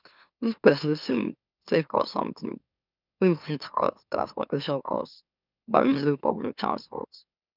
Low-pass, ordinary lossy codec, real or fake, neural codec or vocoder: 5.4 kHz; none; fake; autoencoder, 44.1 kHz, a latent of 192 numbers a frame, MeloTTS